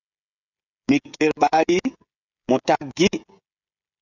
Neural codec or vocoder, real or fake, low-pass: codec, 16 kHz, 16 kbps, FreqCodec, smaller model; fake; 7.2 kHz